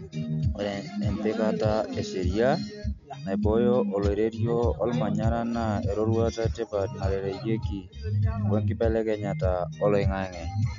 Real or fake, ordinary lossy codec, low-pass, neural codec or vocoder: real; MP3, 96 kbps; 7.2 kHz; none